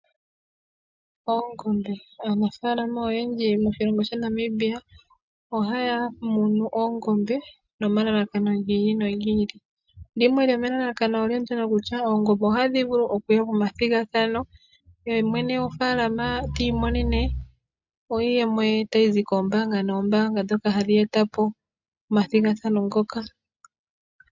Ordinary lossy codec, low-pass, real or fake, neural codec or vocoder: MP3, 64 kbps; 7.2 kHz; real; none